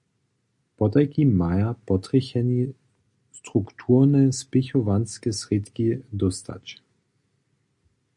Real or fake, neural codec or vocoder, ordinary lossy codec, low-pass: real; none; MP3, 64 kbps; 10.8 kHz